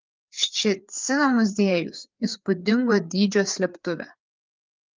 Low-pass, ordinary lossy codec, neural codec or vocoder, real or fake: 7.2 kHz; Opus, 24 kbps; codec, 16 kHz, 4 kbps, FreqCodec, larger model; fake